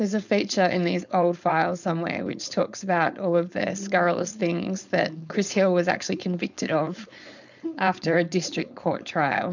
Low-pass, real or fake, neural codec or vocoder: 7.2 kHz; fake; codec, 16 kHz, 4.8 kbps, FACodec